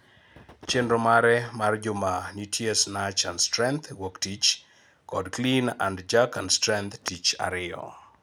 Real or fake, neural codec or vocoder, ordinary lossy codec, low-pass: real; none; none; none